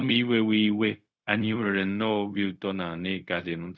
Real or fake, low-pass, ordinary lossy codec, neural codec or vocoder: fake; none; none; codec, 16 kHz, 0.4 kbps, LongCat-Audio-Codec